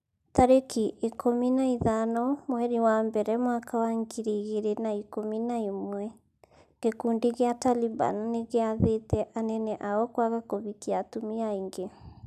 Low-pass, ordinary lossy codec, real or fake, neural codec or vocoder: 14.4 kHz; none; real; none